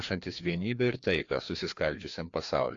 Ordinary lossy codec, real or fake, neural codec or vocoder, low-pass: AAC, 48 kbps; fake; codec, 16 kHz, 2 kbps, FreqCodec, larger model; 7.2 kHz